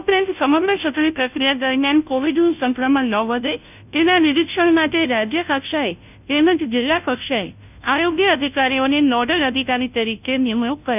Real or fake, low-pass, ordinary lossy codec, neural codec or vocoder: fake; 3.6 kHz; none; codec, 16 kHz, 0.5 kbps, FunCodec, trained on Chinese and English, 25 frames a second